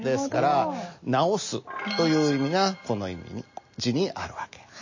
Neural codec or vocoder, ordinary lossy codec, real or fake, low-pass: none; MP3, 32 kbps; real; 7.2 kHz